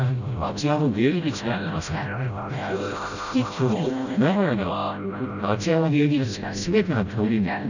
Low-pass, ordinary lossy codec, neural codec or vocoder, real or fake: 7.2 kHz; none; codec, 16 kHz, 0.5 kbps, FreqCodec, smaller model; fake